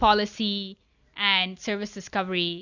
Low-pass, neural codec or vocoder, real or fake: 7.2 kHz; none; real